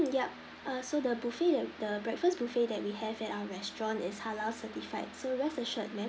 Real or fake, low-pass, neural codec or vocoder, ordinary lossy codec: real; none; none; none